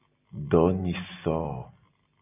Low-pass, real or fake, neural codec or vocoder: 3.6 kHz; real; none